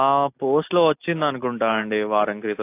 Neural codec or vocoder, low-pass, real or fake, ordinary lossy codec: none; 3.6 kHz; real; AAC, 24 kbps